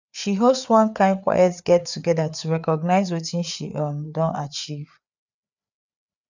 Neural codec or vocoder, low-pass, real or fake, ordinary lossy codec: codec, 16 kHz, 4 kbps, FreqCodec, larger model; 7.2 kHz; fake; none